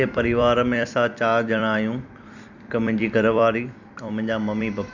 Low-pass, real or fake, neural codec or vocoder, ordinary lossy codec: 7.2 kHz; fake; vocoder, 44.1 kHz, 128 mel bands every 256 samples, BigVGAN v2; none